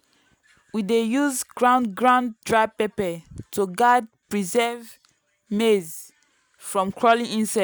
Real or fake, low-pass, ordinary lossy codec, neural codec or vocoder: real; none; none; none